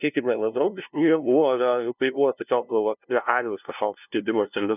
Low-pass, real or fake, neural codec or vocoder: 3.6 kHz; fake; codec, 16 kHz, 0.5 kbps, FunCodec, trained on LibriTTS, 25 frames a second